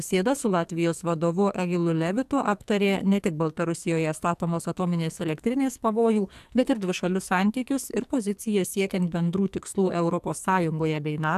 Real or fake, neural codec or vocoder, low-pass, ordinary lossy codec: fake; codec, 44.1 kHz, 2.6 kbps, SNAC; 14.4 kHz; AAC, 96 kbps